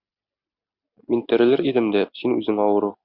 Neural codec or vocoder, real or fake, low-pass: none; real; 5.4 kHz